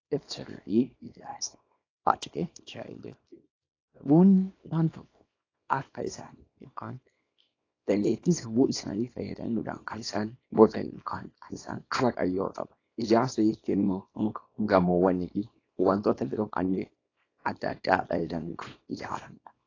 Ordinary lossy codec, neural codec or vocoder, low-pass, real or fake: AAC, 32 kbps; codec, 24 kHz, 0.9 kbps, WavTokenizer, small release; 7.2 kHz; fake